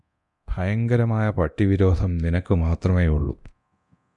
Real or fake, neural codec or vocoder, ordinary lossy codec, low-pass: fake; codec, 24 kHz, 0.9 kbps, DualCodec; MP3, 96 kbps; 10.8 kHz